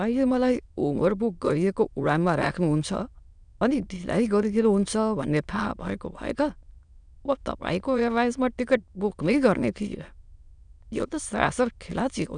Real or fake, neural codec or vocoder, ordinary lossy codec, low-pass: fake; autoencoder, 22.05 kHz, a latent of 192 numbers a frame, VITS, trained on many speakers; none; 9.9 kHz